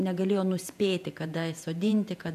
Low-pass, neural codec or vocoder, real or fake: 14.4 kHz; vocoder, 48 kHz, 128 mel bands, Vocos; fake